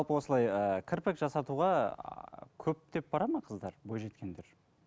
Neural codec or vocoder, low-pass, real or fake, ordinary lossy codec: none; none; real; none